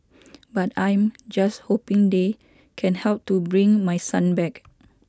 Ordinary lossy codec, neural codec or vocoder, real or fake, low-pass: none; none; real; none